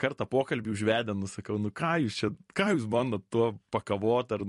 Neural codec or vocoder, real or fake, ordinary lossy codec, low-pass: vocoder, 44.1 kHz, 128 mel bands every 256 samples, BigVGAN v2; fake; MP3, 48 kbps; 14.4 kHz